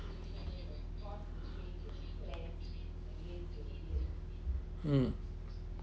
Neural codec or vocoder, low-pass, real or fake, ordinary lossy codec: none; none; real; none